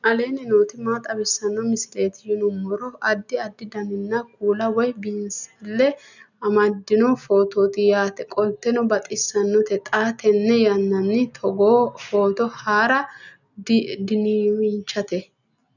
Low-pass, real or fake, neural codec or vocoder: 7.2 kHz; real; none